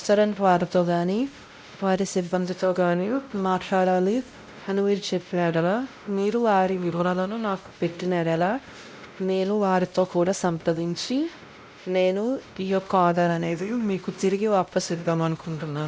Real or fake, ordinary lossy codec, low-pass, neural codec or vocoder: fake; none; none; codec, 16 kHz, 0.5 kbps, X-Codec, WavLM features, trained on Multilingual LibriSpeech